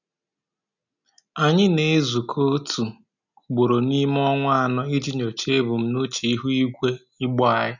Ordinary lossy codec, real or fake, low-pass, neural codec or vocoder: none; real; 7.2 kHz; none